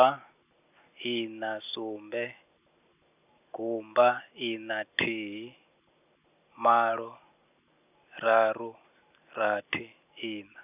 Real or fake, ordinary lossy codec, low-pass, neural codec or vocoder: real; none; 3.6 kHz; none